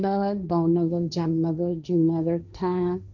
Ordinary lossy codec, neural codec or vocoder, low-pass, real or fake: none; codec, 16 kHz, 1.1 kbps, Voila-Tokenizer; 7.2 kHz; fake